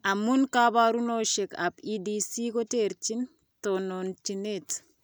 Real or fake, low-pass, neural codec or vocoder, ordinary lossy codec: real; none; none; none